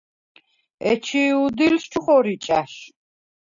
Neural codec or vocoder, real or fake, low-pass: none; real; 7.2 kHz